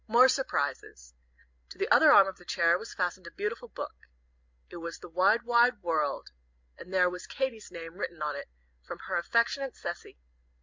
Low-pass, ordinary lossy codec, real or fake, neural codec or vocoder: 7.2 kHz; MP3, 64 kbps; real; none